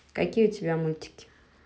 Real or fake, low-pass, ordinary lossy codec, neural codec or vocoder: real; none; none; none